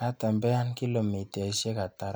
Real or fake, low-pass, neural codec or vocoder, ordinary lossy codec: real; none; none; none